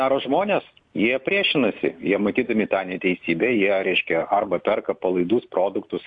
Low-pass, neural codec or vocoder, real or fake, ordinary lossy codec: 9.9 kHz; none; real; AAC, 64 kbps